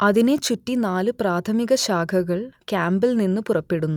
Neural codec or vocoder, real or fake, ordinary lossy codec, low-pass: none; real; none; 19.8 kHz